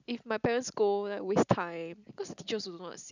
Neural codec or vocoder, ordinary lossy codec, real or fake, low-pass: none; none; real; 7.2 kHz